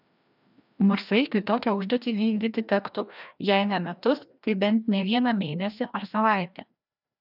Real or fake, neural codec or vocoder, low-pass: fake; codec, 16 kHz, 1 kbps, FreqCodec, larger model; 5.4 kHz